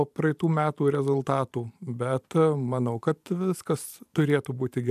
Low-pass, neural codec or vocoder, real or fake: 14.4 kHz; vocoder, 44.1 kHz, 128 mel bands every 512 samples, BigVGAN v2; fake